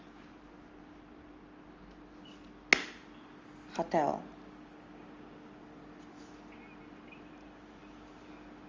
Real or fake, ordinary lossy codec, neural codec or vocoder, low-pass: real; Opus, 32 kbps; none; 7.2 kHz